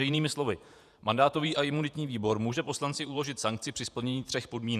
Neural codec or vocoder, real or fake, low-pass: vocoder, 48 kHz, 128 mel bands, Vocos; fake; 14.4 kHz